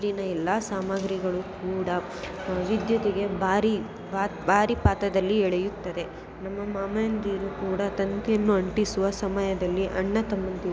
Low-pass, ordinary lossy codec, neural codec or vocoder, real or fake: none; none; none; real